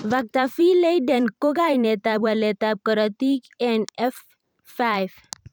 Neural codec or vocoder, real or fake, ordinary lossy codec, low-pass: vocoder, 44.1 kHz, 128 mel bands every 512 samples, BigVGAN v2; fake; none; none